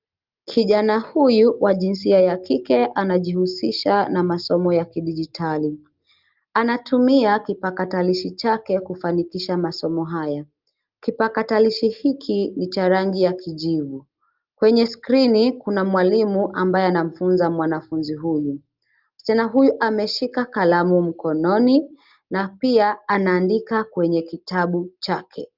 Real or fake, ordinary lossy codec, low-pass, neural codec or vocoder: real; Opus, 32 kbps; 5.4 kHz; none